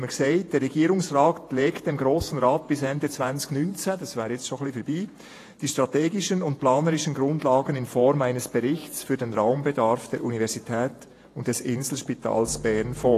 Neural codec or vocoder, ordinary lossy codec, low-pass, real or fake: vocoder, 48 kHz, 128 mel bands, Vocos; AAC, 48 kbps; 14.4 kHz; fake